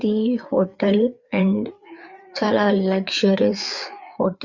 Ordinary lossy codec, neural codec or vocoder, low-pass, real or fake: Opus, 64 kbps; codec, 16 kHz, 4 kbps, FreqCodec, larger model; 7.2 kHz; fake